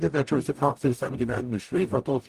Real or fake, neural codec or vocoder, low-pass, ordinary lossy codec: fake; codec, 44.1 kHz, 0.9 kbps, DAC; 14.4 kHz; Opus, 24 kbps